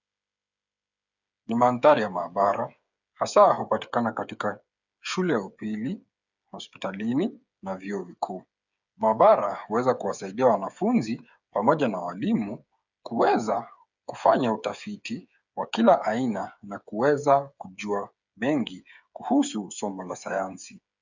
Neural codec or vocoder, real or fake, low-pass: codec, 16 kHz, 8 kbps, FreqCodec, smaller model; fake; 7.2 kHz